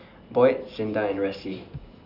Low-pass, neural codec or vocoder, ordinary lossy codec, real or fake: 5.4 kHz; none; none; real